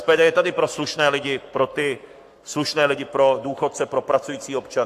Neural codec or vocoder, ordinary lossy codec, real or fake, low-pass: codec, 44.1 kHz, 7.8 kbps, Pupu-Codec; AAC, 64 kbps; fake; 14.4 kHz